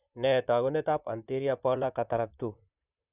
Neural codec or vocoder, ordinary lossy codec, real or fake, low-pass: vocoder, 44.1 kHz, 128 mel bands, Pupu-Vocoder; none; fake; 3.6 kHz